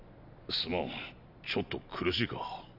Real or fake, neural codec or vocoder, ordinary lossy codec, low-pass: real; none; none; 5.4 kHz